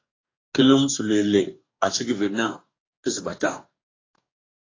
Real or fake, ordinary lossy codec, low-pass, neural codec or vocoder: fake; AAC, 32 kbps; 7.2 kHz; codec, 44.1 kHz, 2.6 kbps, DAC